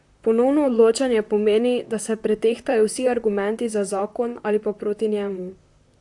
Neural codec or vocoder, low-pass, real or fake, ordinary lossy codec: vocoder, 44.1 kHz, 128 mel bands, Pupu-Vocoder; 10.8 kHz; fake; AAC, 64 kbps